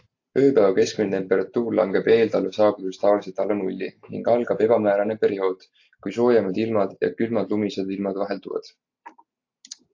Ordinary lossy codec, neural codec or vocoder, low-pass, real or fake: AAC, 48 kbps; none; 7.2 kHz; real